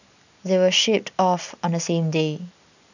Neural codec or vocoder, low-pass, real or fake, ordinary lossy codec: none; 7.2 kHz; real; none